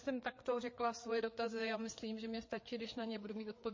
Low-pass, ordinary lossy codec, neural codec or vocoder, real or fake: 7.2 kHz; MP3, 32 kbps; codec, 16 kHz, 4 kbps, FreqCodec, larger model; fake